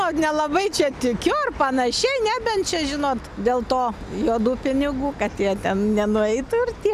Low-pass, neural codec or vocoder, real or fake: 14.4 kHz; none; real